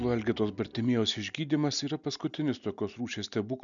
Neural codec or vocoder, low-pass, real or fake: none; 7.2 kHz; real